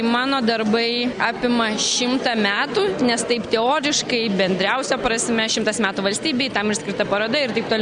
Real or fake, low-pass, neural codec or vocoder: real; 9.9 kHz; none